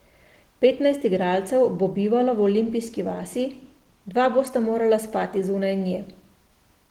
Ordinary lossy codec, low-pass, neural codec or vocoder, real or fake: Opus, 16 kbps; 19.8 kHz; none; real